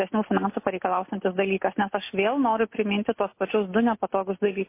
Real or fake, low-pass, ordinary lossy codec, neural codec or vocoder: real; 3.6 kHz; MP3, 24 kbps; none